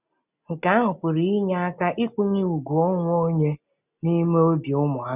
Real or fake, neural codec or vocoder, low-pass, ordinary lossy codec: real; none; 3.6 kHz; none